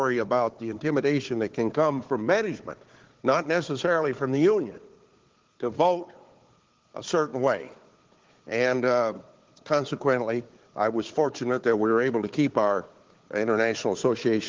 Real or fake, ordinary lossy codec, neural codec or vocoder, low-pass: fake; Opus, 16 kbps; codec, 16 kHz, 4 kbps, FunCodec, trained on Chinese and English, 50 frames a second; 7.2 kHz